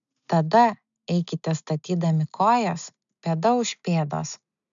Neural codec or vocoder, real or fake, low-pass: none; real; 7.2 kHz